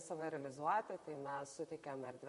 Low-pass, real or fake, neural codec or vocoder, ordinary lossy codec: 14.4 kHz; fake; vocoder, 44.1 kHz, 128 mel bands, Pupu-Vocoder; MP3, 48 kbps